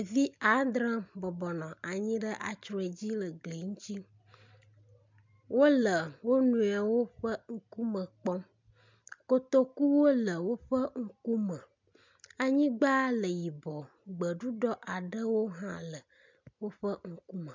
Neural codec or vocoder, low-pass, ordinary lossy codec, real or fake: none; 7.2 kHz; MP3, 64 kbps; real